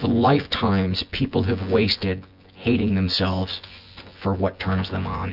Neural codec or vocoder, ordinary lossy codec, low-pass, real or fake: vocoder, 24 kHz, 100 mel bands, Vocos; Opus, 64 kbps; 5.4 kHz; fake